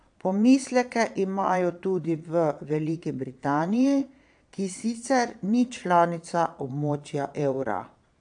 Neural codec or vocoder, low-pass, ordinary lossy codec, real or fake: vocoder, 22.05 kHz, 80 mel bands, Vocos; 9.9 kHz; AAC, 64 kbps; fake